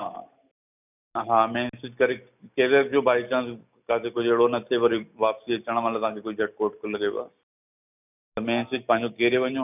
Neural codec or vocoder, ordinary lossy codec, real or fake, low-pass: none; none; real; 3.6 kHz